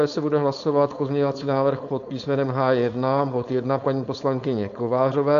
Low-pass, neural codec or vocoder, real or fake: 7.2 kHz; codec, 16 kHz, 4.8 kbps, FACodec; fake